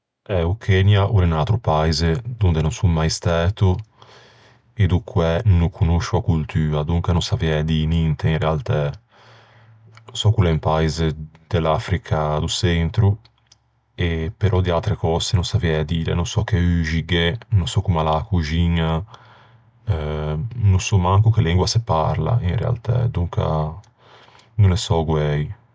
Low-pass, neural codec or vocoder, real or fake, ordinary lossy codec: none; none; real; none